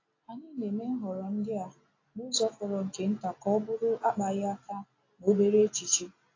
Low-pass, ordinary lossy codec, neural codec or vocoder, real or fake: 7.2 kHz; AAC, 32 kbps; none; real